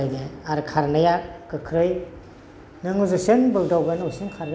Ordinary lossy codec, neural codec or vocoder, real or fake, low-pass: none; none; real; none